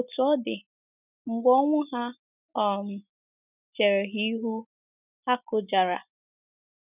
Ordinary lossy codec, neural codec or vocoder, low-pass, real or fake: none; none; 3.6 kHz; real